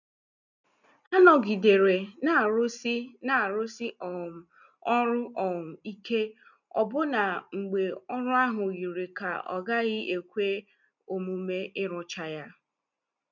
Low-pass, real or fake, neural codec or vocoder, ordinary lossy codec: 7.2 kHz; real; none; none